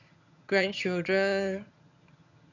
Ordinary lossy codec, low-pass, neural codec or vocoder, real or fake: none; 7.2 kHz; vocoder, 22.05 kHz, 80 mel bands, HiFi-GAN; fake